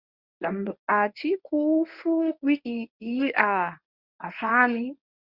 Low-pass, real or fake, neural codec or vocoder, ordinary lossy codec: 5.4 kHz; fake; codec, 24 kHz, 0.9 kbps, WavTokenizer, medium speech release version 1; Opus, 64 kbps